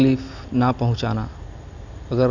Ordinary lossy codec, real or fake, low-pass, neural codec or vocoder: none; real; 7.2 kHz; none